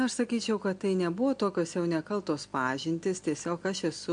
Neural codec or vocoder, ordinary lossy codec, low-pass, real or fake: none; MP3, 64 kbps; 9.9 kHz; real